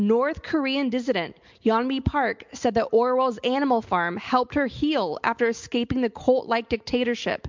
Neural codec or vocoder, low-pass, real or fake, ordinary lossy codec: none; 7.2 kHz; real; MP3, 64 kbps